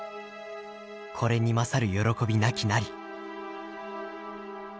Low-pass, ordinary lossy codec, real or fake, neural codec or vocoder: none; none; real; none